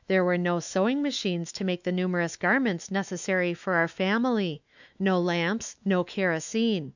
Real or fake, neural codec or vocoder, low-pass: fake; autoencoder, 48 kHz, 128 numbers a frame, DAC-VAE, trained on Japanese speech; 7.2 kHz